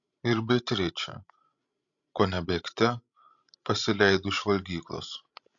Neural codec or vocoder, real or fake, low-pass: codec, 16 kHz, 16 kbps, FreqCodec, larger model; fake; 7.2 kHz